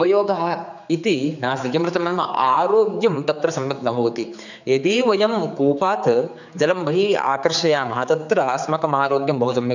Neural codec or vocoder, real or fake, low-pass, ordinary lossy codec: codec, 16 kHz, 4 kbps, X-Codec, HuBERT features, trained on general audio; fake; 7.2 kHz; none